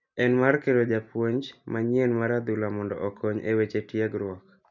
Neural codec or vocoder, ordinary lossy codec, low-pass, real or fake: none; none; 7.2 kHz; real